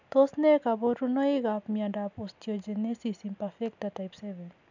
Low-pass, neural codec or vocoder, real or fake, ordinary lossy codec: 7.2 kHz; none; real; none